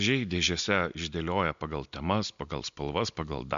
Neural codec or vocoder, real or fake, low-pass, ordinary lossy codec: none; real; 7.2 kHz; MP3, 64 kbps